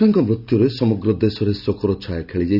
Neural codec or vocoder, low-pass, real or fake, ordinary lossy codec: none; 5.4 kHz; real; none